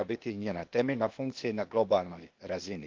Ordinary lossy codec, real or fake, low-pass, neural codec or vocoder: Opus, 32 kbps; fake; 7.2 kHz; codec, 16 kHz, 0.8 kbps, ZipCodec